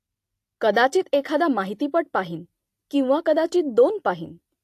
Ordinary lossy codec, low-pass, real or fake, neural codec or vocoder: AAC, 64 kbps; 14.4 kHz; real; none